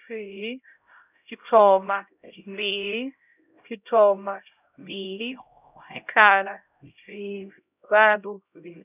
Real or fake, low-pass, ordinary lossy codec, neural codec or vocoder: fake; 3.6 kHz; none; codec, 16 kHz, 0.5 kbps, X-Codec, HuBERT features, trained on LibriSpeech